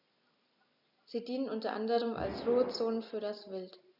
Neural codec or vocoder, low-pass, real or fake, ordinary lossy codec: none; 5.4 kHz; real; none